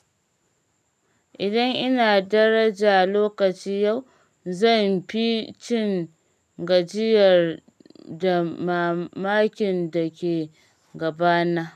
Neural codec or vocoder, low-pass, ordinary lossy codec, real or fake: none; 14.4 kHz; none; real